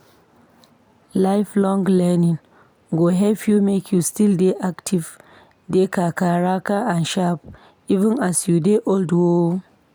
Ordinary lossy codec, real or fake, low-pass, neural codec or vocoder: none; real; none; none